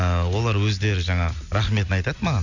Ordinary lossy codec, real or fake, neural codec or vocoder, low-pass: none; real; none; 7.2 kHz